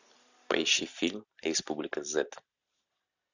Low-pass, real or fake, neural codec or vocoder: 7.2 kHz; real; none